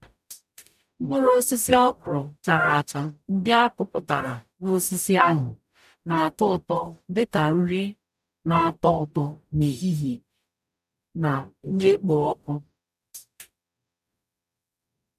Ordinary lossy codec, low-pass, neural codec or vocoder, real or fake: none; 14.4 kHz; codec, 44.1 kHz, 0.9 kbps, DAC; fake